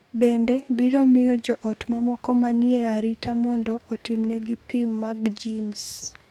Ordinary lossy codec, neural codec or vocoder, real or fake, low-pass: none; codec, 44.1 kHz, 2.6 kbps, DAC; fake; 19.8 kHz